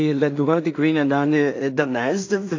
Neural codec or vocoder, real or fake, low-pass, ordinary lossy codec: codec, 16 kHz in and 24 kHz out, 0.4 kbps, LongCat-Audio-Codec, two codebook decoder; fake; 7.2 kHz; AAC, 48 kbps